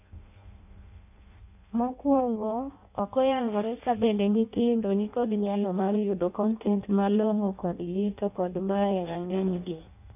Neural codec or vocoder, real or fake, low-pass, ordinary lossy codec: codec, 16 kHz in and 24 kHz out, 0.6 kbps, FireRedTTS-2 codec; fake; 3.6 kHz; none